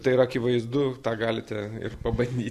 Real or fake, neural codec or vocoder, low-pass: real; none; 14.4 kHz